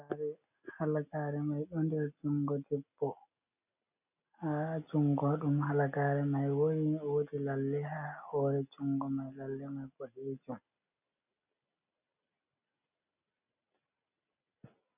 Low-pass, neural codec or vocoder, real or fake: 3.6 kHz; none; real